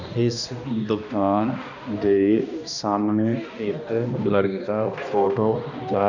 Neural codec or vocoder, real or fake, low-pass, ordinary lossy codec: codec, 16 kHz, 1 kbps, X-Codec, HuBERT features, trained on balanced general audio; fake; 7.2 kHz; none